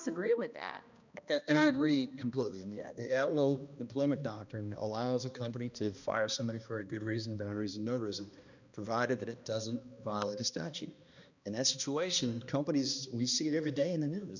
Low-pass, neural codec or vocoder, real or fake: 7.2 kHz; codec, 16 kHz, 1 kbps, X-Codec, HuBERT features, trained on balanced general audio; fake